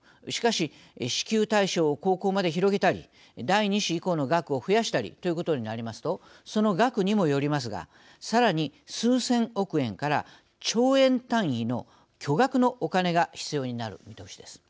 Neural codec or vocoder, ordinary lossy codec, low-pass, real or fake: none; none; none; real